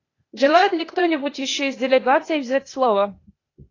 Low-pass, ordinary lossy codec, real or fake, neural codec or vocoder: 7.2 kHz; AAC, 32 kbps; fake; codec, 16 kHz, 0.8 kbps, ZipCodec